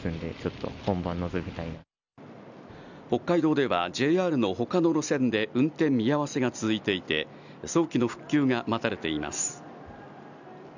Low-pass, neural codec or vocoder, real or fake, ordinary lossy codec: 7.2 kHz; none; real; none